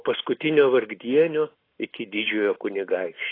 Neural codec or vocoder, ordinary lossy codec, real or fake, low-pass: none; AAC, 24 kbps; real; 5.4 kHz